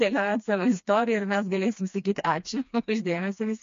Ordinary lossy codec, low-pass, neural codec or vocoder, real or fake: MP3, 48 kbps; 7.2 kHz; codec, 16 kHz, 2 kbps, FreqCodec, smaller model; fake